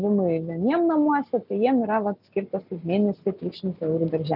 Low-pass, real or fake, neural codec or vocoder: 5.4 kHz; real; none